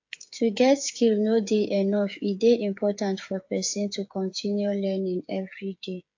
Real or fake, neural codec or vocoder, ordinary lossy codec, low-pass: fake; codec, 16 kHz, 8 kbps, FreqCodec, smaller model; AAC, 48 kbps; 7.2 kHz